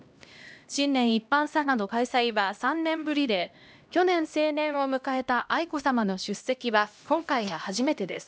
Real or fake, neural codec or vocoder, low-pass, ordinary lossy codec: fake; codec, 16 kHz, 1 kbps, X-Codec, HuBERT features, trained on LibriSpeech; none; none